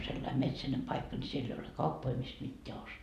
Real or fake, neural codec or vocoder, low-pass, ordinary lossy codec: real; none; 14.4 kHz; none